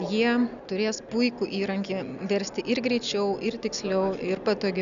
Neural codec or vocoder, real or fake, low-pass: none; real; 7.2 kHz